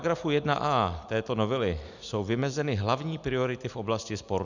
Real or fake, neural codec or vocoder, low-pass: real; none; 7.2 kHz